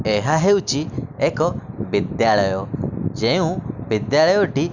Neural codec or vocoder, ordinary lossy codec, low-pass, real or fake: none; none; 7.2 kHz; real